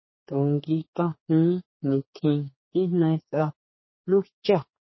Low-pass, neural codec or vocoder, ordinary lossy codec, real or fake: 7.2 kHz; codec, 16 kHz, 4 kbps, X-Codec, HuBERT features, trained on general audio; MP3, 24 kbps; fake